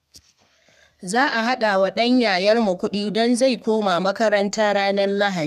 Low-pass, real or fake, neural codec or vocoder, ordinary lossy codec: 14.4 kHz; fake; codec, 32 kHz, 1.9 kbps, SNAC; none